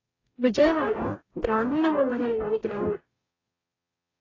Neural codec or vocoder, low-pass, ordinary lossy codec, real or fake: codec, 44.1 kHz, 0.9 kbps, DAC; 7.2 kHz; AAC, 32 kbps; fake